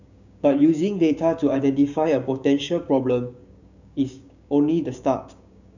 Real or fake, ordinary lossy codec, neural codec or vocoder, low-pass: fake; none; codec, 16 kHz, 6 kbps, DAC; 7.2 kHz